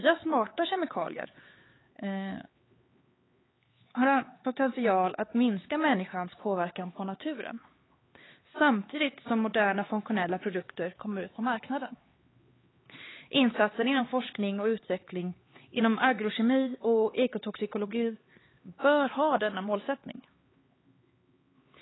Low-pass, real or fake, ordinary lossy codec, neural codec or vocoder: 7.2 kHz; fake; AAC, 16 kbps; codec, 16 kHz, 4 kbps, X-Codec, HuBERT features, trained on LibriSpeech